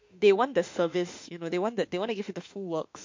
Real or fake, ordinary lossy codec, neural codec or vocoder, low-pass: fake; MP3, 48 kbps; autoencoder, 48 kHz, 32 numbers a frame, DAC-VAE, trained on Japanese speech; 7.2 kHz